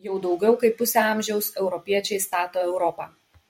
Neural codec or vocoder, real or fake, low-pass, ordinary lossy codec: vocoder, 44.1 kHz, 128 mel bands every 256 samples, BigVGAN v2; fake; 19.8 kHz; MP3, 64 kbps